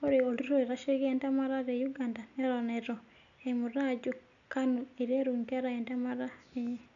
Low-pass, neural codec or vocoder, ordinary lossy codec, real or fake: 7.2 kHz; none; none; real